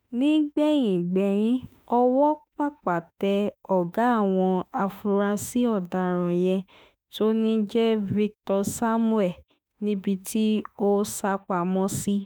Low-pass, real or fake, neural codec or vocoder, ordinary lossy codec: none; fake; autoencoder, 48 kHz, 32 numbers a frame, DAC-VAE, trained on Japanese speech; none